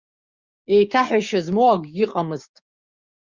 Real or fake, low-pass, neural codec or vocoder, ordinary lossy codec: fake; 7.2 kHz; codec, 16 kHz, 6 kbps, DAC; Opus, 64 kbps